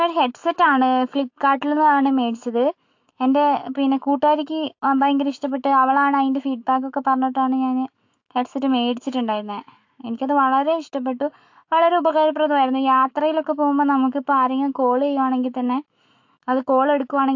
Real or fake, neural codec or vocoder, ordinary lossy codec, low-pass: fake; autoencoder, 48 kHz, 128 numbers a frame, DAC-VAE, trained on Japanese speech; AAC, 48 kbps; 7.2 kHz